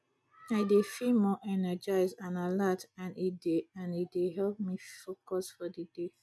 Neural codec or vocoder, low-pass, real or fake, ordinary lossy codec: none; none; real; none